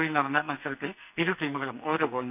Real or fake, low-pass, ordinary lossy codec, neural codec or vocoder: fake; 3.6 kHz; none; codec, 44.1 kHz, 2.6 kbps, SNAC